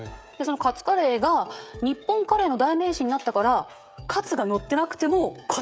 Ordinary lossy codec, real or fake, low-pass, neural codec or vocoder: none; fake; none; codec, 16 kHz, 16 kbps, FreqCodec, smaller model